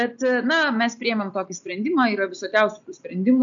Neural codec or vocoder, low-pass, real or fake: none; 7.2 kHz; real